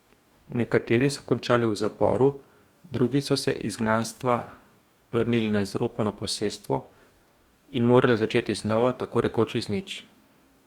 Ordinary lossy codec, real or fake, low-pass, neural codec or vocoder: Opus, 64 kbps; fake; 19.8 kHz; codec, 44.1 kHz, 2.6 kbps, DAC